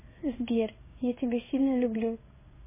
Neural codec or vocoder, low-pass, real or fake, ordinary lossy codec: codec, 16 kHz in and 24 kHz out, 1 kbps, XY-Tokenizer; 3.6 kHz; fake; MP3, 16 kbps